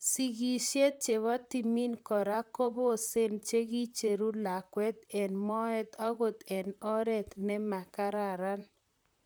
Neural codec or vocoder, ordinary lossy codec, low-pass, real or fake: vocoder, 44.1 kHz, 128 mel bands, Pupu-Vocoder; none; none; fake